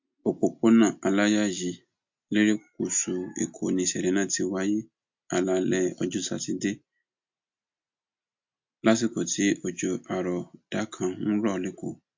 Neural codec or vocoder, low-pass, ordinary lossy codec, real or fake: none; 7.2 kHz; MP3, 48 kbps; real